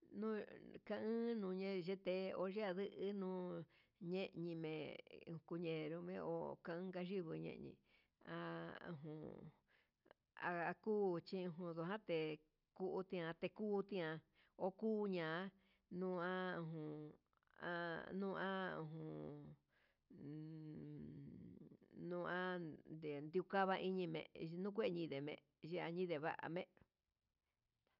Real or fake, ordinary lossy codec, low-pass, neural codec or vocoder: real; none; 5.4 kHz; none